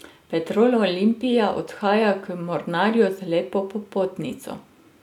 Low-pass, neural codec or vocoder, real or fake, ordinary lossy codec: 19.8 kHz; none; real; none